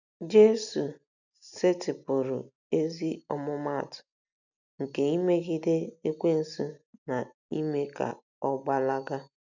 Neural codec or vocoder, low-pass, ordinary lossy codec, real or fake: none; 7.2 kHz; none; real